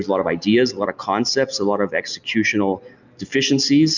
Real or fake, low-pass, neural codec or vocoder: real; 7.2 kHz; none